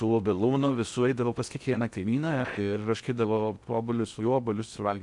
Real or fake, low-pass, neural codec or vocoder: fake; 10.8 kHz; codec, 16 kHz in and 24 kHz out, 0.6 kbps, FocalCodec, streaming, 4096 codes